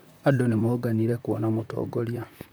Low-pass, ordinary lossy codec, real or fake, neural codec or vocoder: none; none; fake; vocoder, 44.1 kHz, 128 mel bands, Pupu-Vocoder